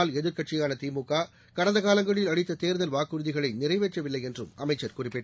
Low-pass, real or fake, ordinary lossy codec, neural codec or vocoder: none; real; none; none